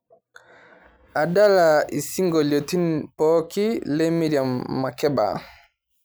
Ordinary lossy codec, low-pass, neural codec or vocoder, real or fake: none; none; none; real